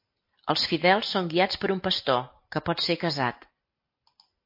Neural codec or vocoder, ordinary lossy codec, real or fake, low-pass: none; MP3, 32 kbps; real; 5.4 kHz